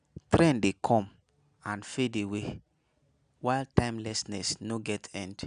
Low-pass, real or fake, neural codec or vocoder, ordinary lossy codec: 9.9 kHz; real; none; none